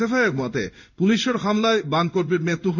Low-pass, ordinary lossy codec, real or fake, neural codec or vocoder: 7.2 kHz; none; fake; codec, 16 kHz in and 24 kHz out, 1 kbps, XY-Tokenizer